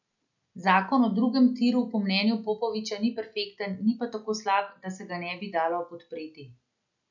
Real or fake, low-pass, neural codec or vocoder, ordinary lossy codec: real; 7.2 kHz; none; none